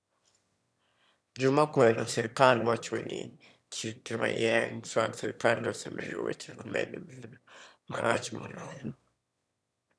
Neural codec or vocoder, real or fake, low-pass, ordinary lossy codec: autoencoder, 22.05 kHz, a latent of 192 numbers a frame, VITS, trained on one speaker; fake; none; none